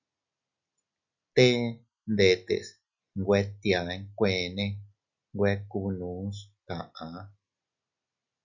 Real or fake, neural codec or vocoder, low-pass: real; none; 7.2 kHz